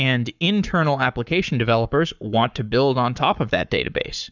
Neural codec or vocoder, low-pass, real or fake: codec, 44.1 kHz, 7.8 kbps, Pupu-Codec; 7.2 kHz; fake